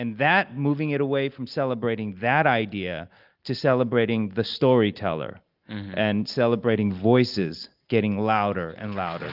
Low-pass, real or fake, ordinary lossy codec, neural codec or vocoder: 5.4 kHz; real; Opus, 24 kbps; none